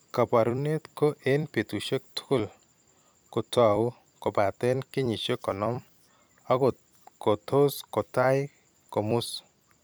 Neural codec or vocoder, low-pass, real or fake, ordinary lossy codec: vocoder, 44.1 kHz, 128 mel bands every 256 samples, BigVGAN v2; none; fake; none